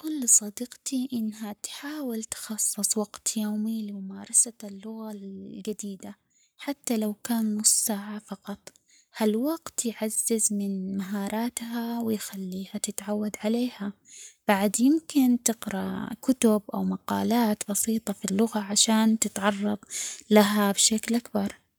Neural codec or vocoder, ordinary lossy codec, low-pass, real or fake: codec, 44.1 kHz, 7.8 kbps, Pupu-Codec; none; none; fake